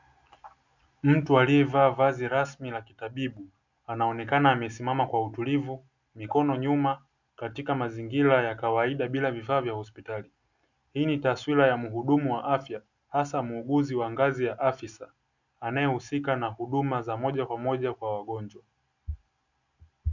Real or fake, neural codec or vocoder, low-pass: real; none; 7.2 kHz